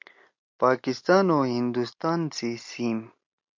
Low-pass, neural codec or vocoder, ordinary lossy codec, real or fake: 7.2 kHz; none; MP3, 48 kbps; real